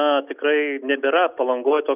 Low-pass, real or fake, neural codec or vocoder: 3.6 kHz; real; none